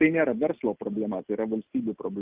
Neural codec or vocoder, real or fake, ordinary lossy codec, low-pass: none; real; Opus, 32 kbps; 3.6 kHz